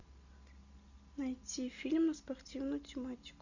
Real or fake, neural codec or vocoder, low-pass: real; none; 7.2 kHz